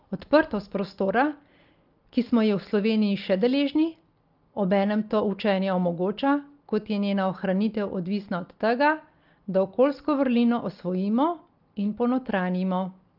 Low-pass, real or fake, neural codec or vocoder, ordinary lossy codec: 5.4 kHz; real; none; Opus, 32 kbps